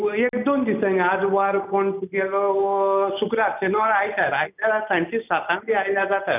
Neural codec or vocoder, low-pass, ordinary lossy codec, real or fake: none; 3.6 kHz; none; real